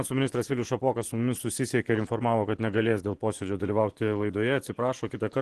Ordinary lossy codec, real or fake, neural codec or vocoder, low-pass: Opus, 16 kbps; fake; vocoder, 24 kHz, 100 mel bands, Vocos; 10.8 kHz